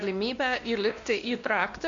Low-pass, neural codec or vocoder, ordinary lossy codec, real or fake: 7.2 kHz; codec, 16 kHz, 1 kbps, X-Codec, WavLM features, trained on Multilingual LibriSpeech; AAC, 64 kbps; fake